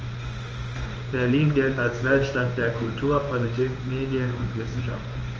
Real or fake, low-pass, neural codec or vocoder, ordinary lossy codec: fake; 7.2 kHz; codec, 16 kHz in and 24 kHz out, 1 kbps, XY-Tokenizer; Opus, 24 kbps